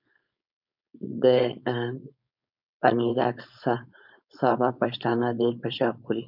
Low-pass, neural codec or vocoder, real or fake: 5.4 kHz; codec, 16 kHz, 4.8 kbps, FACodec; fake